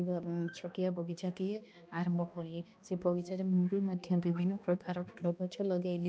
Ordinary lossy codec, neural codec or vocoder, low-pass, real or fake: none; codec, 16 kHz, 1 kbps, X-Codec, HuBERT features, trained on balanced general audio; none; fake